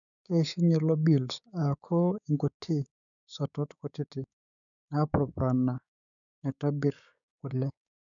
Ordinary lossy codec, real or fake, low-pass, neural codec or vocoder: none; fake; 7.2 kHz; codec, 16 kHz, 6 kbps, DAC